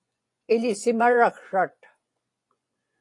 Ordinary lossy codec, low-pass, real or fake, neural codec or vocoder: AAC, 48 kbps; 10.8 kHz; fake; vocoder, 24 kHz, 100 mel bands, Vocos